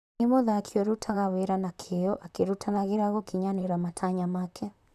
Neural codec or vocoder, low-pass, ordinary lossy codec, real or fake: vocoder, 44.1 kHz, 128 mel bands, Pupu-Vocoder; 14.4 kHz; none; fake